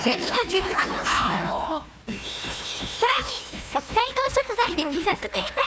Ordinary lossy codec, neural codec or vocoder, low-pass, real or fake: none; codec, 16 kHz, 1 kbps, FunCodec, trained on Chinese and English, 50 frames a second; none; fake